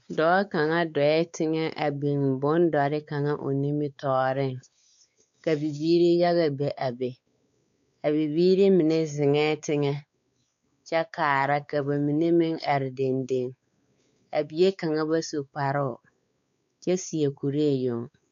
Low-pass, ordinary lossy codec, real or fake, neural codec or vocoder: 7.2 kHz; MP3, 64 kbps; fake; codec, 16 kHz, 4 kbps, X-Codec, WavLM features, trained on Multilingual LibriSpeech